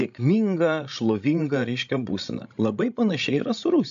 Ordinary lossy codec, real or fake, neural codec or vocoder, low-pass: AAC, 64 kbps; fake; codec, 16 kHz, 16 kbps, FreqCodec, larger model; 7.2 kHz